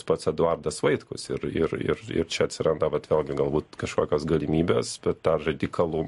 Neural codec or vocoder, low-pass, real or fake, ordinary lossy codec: none; 14.4 kHz; real; MP3, 48 kbps